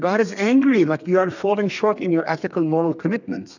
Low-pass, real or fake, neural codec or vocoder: 7.2 kHz; fake; codec, 32 kHz, 1.9 kbps, SNAC